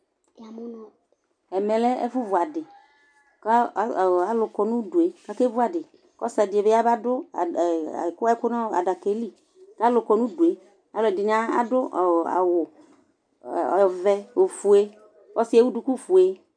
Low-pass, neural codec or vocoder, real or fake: 9.9 kHz; none; real